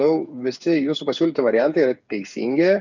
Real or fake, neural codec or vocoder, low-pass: fake; codec, 16 kHz, 8 kbps, FreqCodec, smaller model; 7.2 kHz